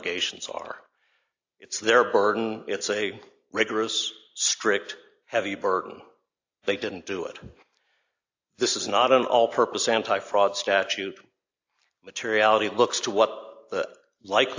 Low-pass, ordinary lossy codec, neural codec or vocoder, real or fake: 7.2 kHz; AAC, 48 kbps; none; real